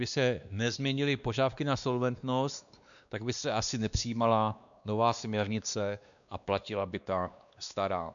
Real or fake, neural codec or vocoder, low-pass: fake; codec, 16 kHz, 2 kbps, X-Codec, WavLM features, trained on Multilingual LibriSpeech; 7.2 kHz